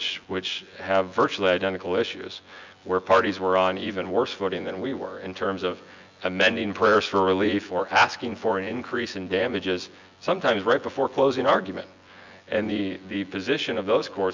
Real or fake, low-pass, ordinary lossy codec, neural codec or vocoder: fake; 7.2 kHz; MP3, 64 kbps; vocoder, 24 kHz, 100 mel bands, Vocos